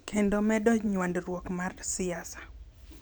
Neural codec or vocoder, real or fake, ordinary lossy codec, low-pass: vocoder, 44.1 kHz, 128 mel bands every 512 samples, BigVGAN v2; fake; none; none